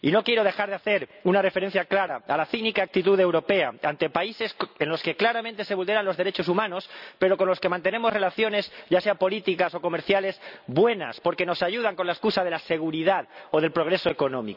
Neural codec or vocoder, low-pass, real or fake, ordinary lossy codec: none; 5.4 kHz; real; none